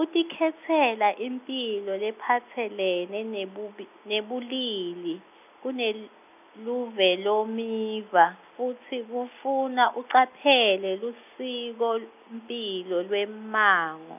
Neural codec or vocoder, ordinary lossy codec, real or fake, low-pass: none; none; real; 3.6 kHz